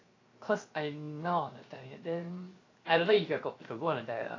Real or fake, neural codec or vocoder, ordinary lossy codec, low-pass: fake; codec, 16 kHz, 0.7 kbps, FocalCodec; AAC, 32 kbps; 7.2 kHz